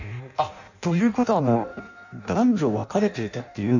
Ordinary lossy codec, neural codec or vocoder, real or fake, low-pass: none; codec, 16 kHz in and 24 kHz out, 0.6 kbps, FireRedTTS-2 codec; fake; 7.2 kHz